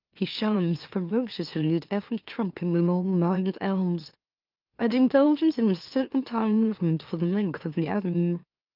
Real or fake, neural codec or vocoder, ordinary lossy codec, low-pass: fake; autoencoder, 44.1 kHz, a latent of 192 numbers a frame, MeloTTS; Opus, 24 kbps; 5.4 kHz